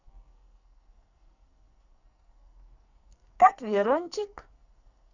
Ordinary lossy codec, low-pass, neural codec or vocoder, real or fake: none; 7.2 kHz; codec, 44.1 kHz, 2.6 kbps, SNAC; fake